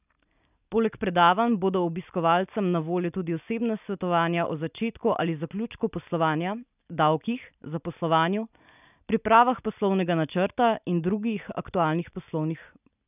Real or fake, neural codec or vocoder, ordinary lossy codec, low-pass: real; none; none; 3.6 kHz